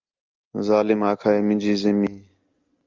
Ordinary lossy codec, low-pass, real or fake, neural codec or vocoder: Opus, 32 kbps; 7.2 kHz; real; none